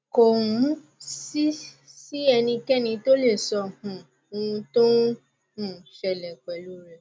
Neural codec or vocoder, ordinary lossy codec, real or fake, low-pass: none; none; real; none